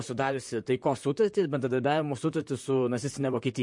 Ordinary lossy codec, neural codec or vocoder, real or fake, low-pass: MP3, 48 kbps; vocoder, 44.1 kHz, 128 mel bands, Pupu-Vocoder; fake; 10.8 kHz